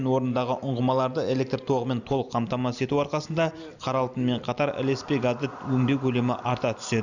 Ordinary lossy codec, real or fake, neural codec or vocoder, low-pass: none; real; none; 7.2 kHz